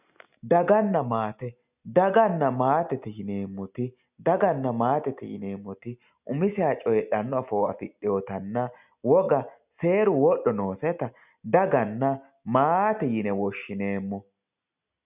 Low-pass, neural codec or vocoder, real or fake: 3.6 kHz; none; real